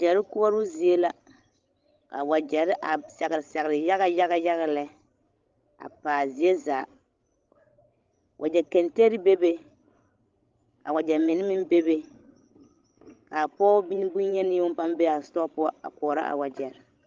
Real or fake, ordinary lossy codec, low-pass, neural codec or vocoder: fake; Opus, 32 kbps; 7.2 kHz; codec, 16 kHz, 16 kbps, FreqCodec, larger model